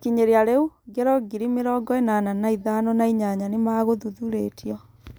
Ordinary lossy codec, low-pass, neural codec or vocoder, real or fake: none; none; none; real